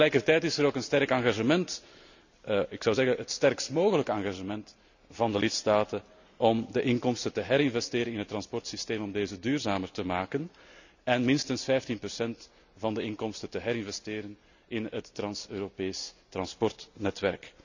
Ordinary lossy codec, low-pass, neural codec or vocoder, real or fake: none; 7.2 kHz; none; real